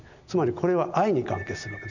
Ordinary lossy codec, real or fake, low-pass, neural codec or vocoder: none; real; 7.2 kHz; none